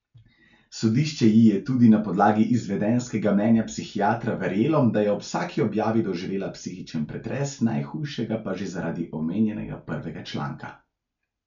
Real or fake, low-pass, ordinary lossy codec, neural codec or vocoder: real; 7.2 kHz; none; none